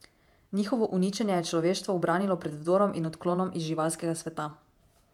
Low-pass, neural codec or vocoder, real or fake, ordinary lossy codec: 19.8 kHz; vocoder, 48 kHz, 128 mel bands, Vocos; fake; MP3, 96 kbps